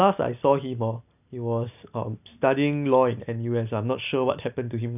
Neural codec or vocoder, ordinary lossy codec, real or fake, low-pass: none; none; real; 3.6 kHz